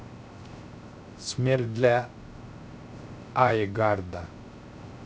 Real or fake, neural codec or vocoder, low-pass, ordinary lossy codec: fake; codec, 16 kHz, 0.3 kbps, FocalCodec; none; none